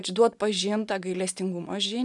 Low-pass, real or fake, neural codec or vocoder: 10.8 kHz; real; none